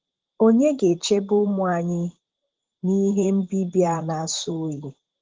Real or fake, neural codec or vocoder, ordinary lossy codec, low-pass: fake; vocoder, 44.1 kHz, 128 mel bands, Pupu-Vocoder; Opus, 16 kbps; 7.2 kHz